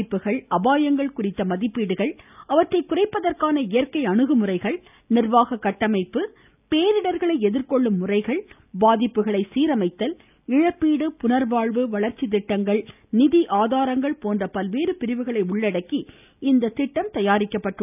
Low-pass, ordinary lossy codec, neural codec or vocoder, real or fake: 3.6 kHz; none; none; real